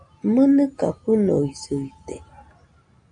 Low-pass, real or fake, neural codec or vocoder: 9.9 kHz; real; none